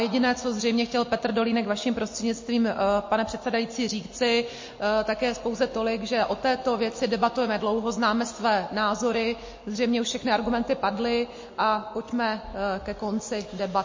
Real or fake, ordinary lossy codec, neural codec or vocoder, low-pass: real; MP3, 32 kbps; none; 7.2 kHz